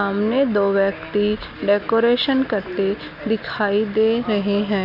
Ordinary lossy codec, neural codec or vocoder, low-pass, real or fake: none; none; 5.4 kHz; real